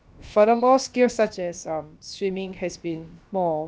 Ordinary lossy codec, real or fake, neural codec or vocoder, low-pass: none; fake; codec, 16 kHz, about 1 kbps, DyCAST, with the encoder's durations; none